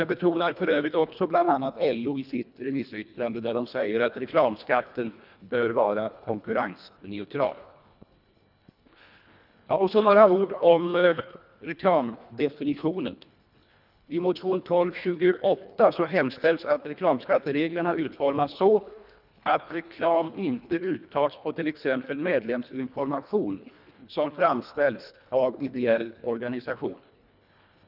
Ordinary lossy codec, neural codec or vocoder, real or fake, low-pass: none; codec, 24 kHz, 1.5 kbps, HILCodec; fake; 5.4 kHz